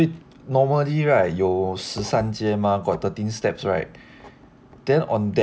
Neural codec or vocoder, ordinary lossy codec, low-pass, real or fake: none; none; none; real